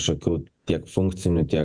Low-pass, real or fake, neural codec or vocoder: 9.9 kHz; fake; vocoder, 48 kHz, 128 mel bands, Vocos